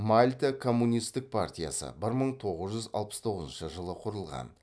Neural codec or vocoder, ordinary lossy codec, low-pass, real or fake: none; none; none; real